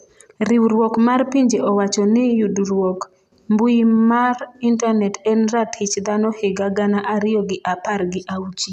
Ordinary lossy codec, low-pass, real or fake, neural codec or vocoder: none; 14.4 kHz; real; none